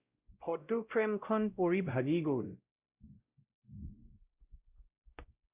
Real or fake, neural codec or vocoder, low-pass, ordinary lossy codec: fake; codec, 16 kHz, 0.5 kbps, X-Codec, WavLM features, trained on Multilingual LibriSpeech; 3.6 kHz; Opus, 64 kbps